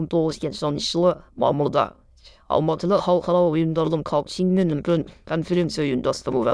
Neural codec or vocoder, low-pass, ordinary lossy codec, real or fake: autoencoder, 22.05 kHz, a latent of 192 numbers a frame, VITS, trained on many speakers; none; none; fake